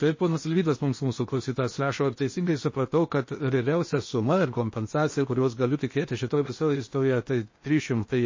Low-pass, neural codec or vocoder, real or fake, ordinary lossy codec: 7.2 kHz; codec, 16 kHz in and 24 kHz out, 0.8 kbps, FocalCodec, streaming, 65536 codes; fake; MP3, 32 kbps